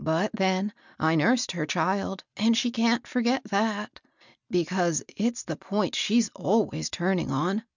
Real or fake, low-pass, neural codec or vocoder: real; 7.2 kHz; none